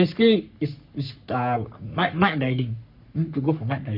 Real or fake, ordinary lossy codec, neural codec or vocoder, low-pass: fake; none; codec, 44.1 kHz, 3.4 kbps, Pupu-Codec; 5.4 kHz